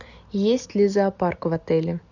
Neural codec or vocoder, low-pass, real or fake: none; 7.2 kHz; real